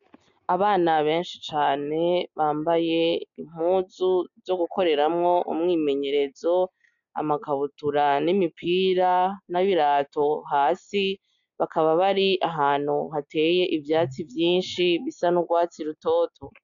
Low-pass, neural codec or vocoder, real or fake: 7.2 kHz; none; real